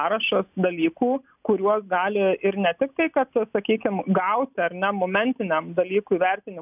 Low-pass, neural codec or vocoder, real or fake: 3.6 kHz; none; real